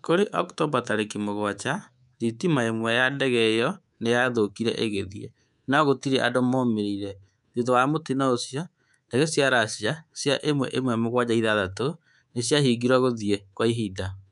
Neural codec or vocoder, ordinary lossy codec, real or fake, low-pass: codec, 24 kHz, 3.1 kbps, DualCodec; none; fake; 10.8 kHz